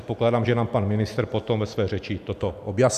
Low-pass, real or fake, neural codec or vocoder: 14.4 kHz; real; none